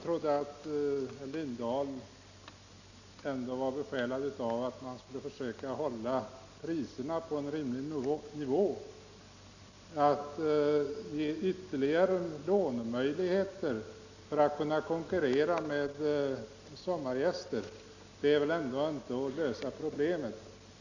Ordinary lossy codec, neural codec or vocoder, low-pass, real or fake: none; none; 7.2 kHz; real